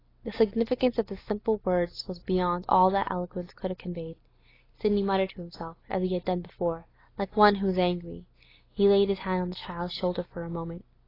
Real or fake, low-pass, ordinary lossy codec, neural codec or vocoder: real; 5.4 kHz; AAC, 24 kbps; none